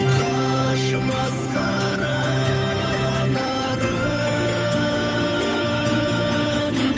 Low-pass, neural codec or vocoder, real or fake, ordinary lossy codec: none; codec, 16 kHz, 8 kbps, FunCodec, trained on Chinese and English, 25 frames a second; fake; none